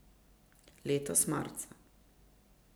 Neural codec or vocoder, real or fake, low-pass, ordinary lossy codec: none; real; none; none